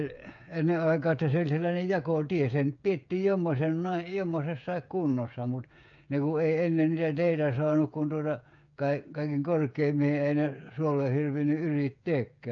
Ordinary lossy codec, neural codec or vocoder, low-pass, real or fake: AAC, 64 kbps; codec, 16 kHz, 8 kbps, FreqCodec, smaller model; 7.2 kHz; fake